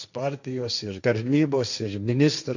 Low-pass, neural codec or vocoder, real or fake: 7.2 kHz; codec, 16 kHz, 1.1 kbps, Voila-Tokenizer; fake